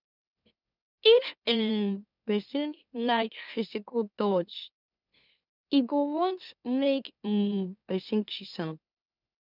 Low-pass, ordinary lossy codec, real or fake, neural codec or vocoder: 5.4 kHz; none; fake; autoencoder, 44.1 kHz, a latent of 192 numbers a frame, MeloTTS